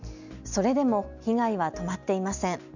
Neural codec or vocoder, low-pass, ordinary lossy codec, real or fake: none; 7.2 kHz; none; real